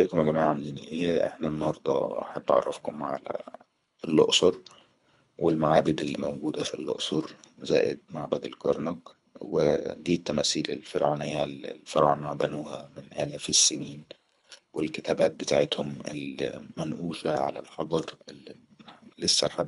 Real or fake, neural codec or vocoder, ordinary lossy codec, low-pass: fake; codec, 24 kHz, 3 kbps, HILCodec; none; 10.8 kHz